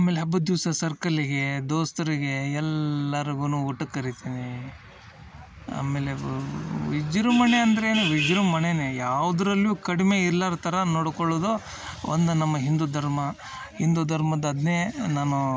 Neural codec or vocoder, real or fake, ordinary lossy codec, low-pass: none; real; none; none